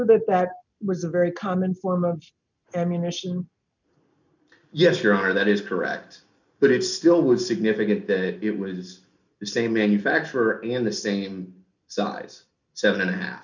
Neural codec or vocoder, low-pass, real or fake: none; 7.2 kHz; real